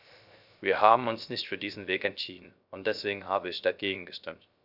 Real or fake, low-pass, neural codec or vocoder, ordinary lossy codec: fake; 5.4 kHz; codec, 16 kHz, 0.3 kbps, FocalCodec; none